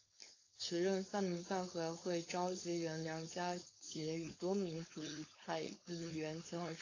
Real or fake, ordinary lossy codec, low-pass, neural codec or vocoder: fake; AAC, 32 kbps; 7.2 kHz; codec, 16 kHz, 4.8 kbps, FACodec